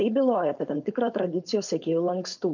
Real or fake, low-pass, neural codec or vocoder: fake; 7.2 kHz; codec, 16 kHz, 4.8 kbps, FACodec